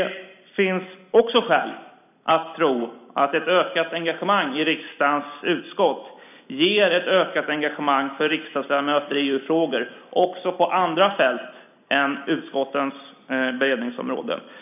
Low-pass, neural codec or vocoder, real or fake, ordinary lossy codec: 3.6 kHz; none; real; none